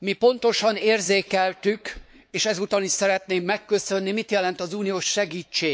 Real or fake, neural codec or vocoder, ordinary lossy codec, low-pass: fake; codec, 16 kHz, 4 kbps, X-Codec, WavLM features, trained on Multilingual LibriSpeech; none; none